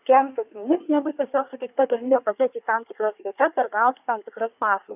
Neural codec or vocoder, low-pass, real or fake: codec, 24 kHz, 1 kbps, SNAC; 3.6 kHz; fake